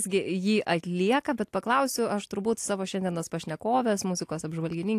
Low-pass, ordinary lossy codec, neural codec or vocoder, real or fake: 14.4 kHz; AAC, 64 kbps; none; real